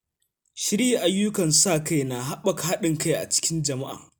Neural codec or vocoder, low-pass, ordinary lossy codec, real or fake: none; none; none; real